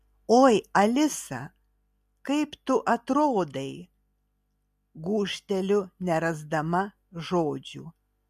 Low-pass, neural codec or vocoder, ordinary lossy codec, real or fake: 14.4 kHz; none; MP3, 64 kbps; real